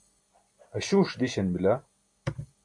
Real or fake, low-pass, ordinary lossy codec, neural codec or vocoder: real; 9.9 kHz; MP3, 48 kbps; none